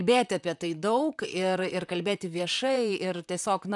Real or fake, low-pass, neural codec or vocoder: fake; 10.8 kHz; vocoder, 44.1 kHz, 128 mel bands, Pupu-Vocoder